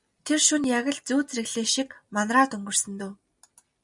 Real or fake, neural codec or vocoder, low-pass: real; none; 10.8 kHz